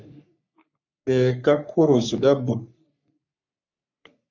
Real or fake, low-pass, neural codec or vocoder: fake; 7.2 kHz; codec, 44.1 kHz, 3.4 kbps, Pupu-Codec